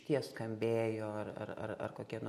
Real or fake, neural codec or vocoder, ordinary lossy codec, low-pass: real; none; MP3, 64 kbps; 19.8 kHz